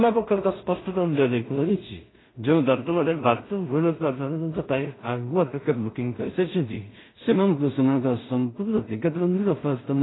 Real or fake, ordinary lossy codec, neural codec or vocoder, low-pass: fake; AAC, 16 kbps; codec, 16 kHz in and 24 kHz out, 0.4 kbps, LongCat-Audio-Codec, two codebook decoder; 7.2 kHz